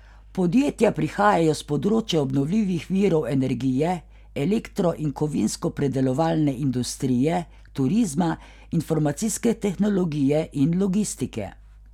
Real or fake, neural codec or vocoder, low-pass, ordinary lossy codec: real; none; 19.8 kHz; none